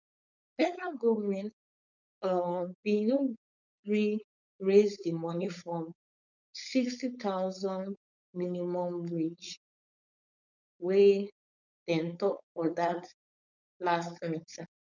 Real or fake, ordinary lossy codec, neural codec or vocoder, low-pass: fake; none; codec, 16 kHz, 4.8 kbps, FACodec; 7.2 kHz